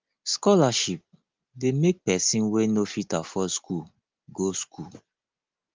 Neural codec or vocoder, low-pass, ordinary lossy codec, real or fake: none; 7.2 kHz; Opus, 32 kbps; real